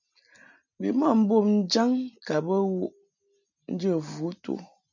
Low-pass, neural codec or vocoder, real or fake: 7.2 kHz; none; real